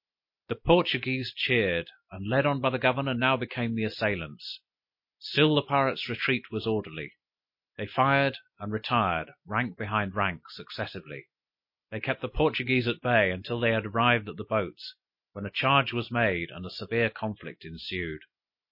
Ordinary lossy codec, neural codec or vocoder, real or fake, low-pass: MP3, 32 kbps; none; real; 5.4 kHz